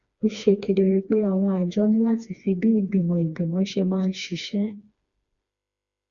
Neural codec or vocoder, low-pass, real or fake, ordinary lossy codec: codec, 16 kHz, 2 kbps, FreqCodec, smaller model; 7.2 kHz; fake; Opus, 64 kbps